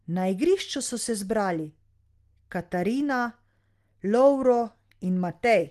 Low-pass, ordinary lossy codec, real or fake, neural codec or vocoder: 14.4 kHz; Opus, 24 kbps; real; none